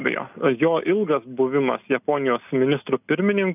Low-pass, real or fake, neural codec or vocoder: 3.6 kHz; real; none